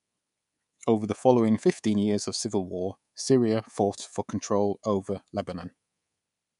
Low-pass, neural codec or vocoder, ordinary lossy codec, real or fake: 10.8 kHz; codec, 24 kHz, 3.1 kbps, DualCodec; none; fake